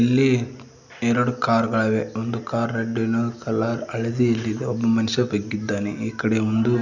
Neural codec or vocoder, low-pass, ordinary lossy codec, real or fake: none; 7.2 kHz; none; real